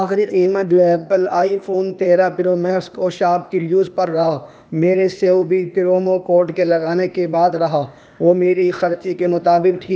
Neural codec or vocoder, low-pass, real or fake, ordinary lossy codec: codec, 16 kHz, 0.8 kbps, ZipCodec; none; fake; none